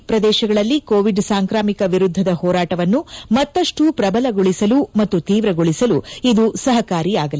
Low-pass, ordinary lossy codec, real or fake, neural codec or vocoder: none; none; real; none